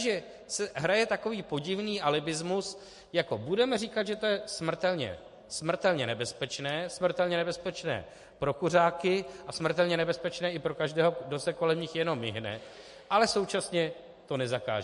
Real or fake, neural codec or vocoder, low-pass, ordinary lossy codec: real; none; 14.4 kHz; MP3, 48 kbps